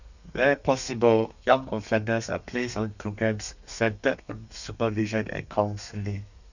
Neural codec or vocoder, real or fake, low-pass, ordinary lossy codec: codec, 32 kHz, 1.9 kbps, SNAC; fake; 7.2 kHz; none